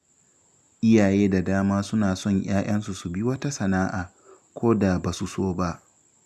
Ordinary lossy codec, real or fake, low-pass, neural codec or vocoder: none; real; 14.4 kHz; none